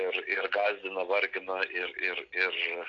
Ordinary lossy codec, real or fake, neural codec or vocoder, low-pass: AAC, 48 kbps; real; none; 7.2 kHz